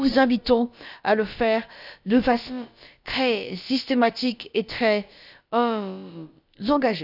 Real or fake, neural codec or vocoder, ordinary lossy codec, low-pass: fake; codec, 16 kHz, about 1 kbps, DyCAST, with the encoder's durations; none; 5.4 kHz